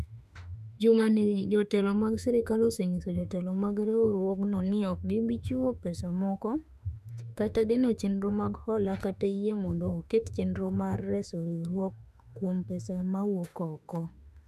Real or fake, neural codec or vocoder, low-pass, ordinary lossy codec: fake; autoencoder, 48 kHz, 32 numbers a frame, DAC-VAE, trained on Japanese speech; 14.4 kHz; none